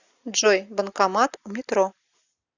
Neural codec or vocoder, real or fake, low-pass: none; real; 7.2 kHz